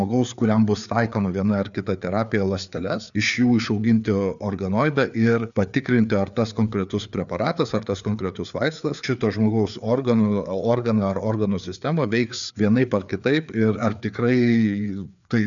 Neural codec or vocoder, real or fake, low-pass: codec, 16 kHz, 4 kbps, FreqCodec, larger model; fake; 7.2 kHz